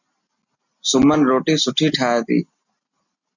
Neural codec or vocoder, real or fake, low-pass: none; real; 7.2 kHz